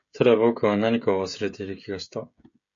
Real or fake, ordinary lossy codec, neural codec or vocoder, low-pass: fake; MP3, 48 kbps; codec, 16 kHz, 16 kbps, FreqCodec, smaller model; 7.2 kHz